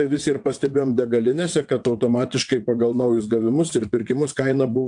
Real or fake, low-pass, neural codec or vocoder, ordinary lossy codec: fake; 9.9 kHz; vocoder, 22.05 kHz, 80 mel bands, WaveNeXt; AAC, 64 kbps